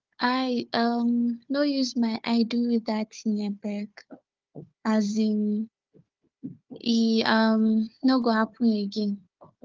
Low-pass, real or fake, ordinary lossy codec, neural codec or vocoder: 7.2 kHz; fake; Opus, 32 kbps; codec, 16 kHz, 4 kbps, FunCodec, trained on Chinese and English, 50 frames a second